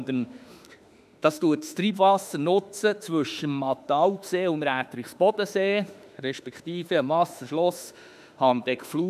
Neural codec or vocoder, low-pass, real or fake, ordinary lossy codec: autoencoder, 48 kHz, 32 numbers a frame, DAC-VAE, trained on Japanese speech; 14.4 kHz; fake; none